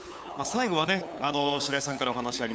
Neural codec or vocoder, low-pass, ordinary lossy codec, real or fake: codec, 16 kHz, 8 kbps, FunCodec, trained on LibriTTS, 25 frames a second; none; none; fake